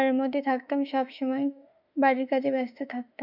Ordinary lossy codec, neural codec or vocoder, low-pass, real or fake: none; autoencoder, 48 kHz, 32 numbers a frame, DAC-VAE, trained on Japanese speech; 5.4 kHz; fake